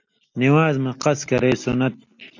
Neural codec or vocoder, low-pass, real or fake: none; 7.2 kHz; real